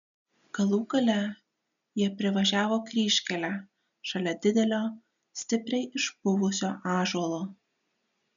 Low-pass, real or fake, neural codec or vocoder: 7.2 kHz; real; none